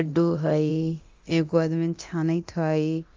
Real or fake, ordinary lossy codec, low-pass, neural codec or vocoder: fake; Opus, 32 kbps; 7.2 kHz; codec, 24 kHz, 0.9 kbps, DualCodec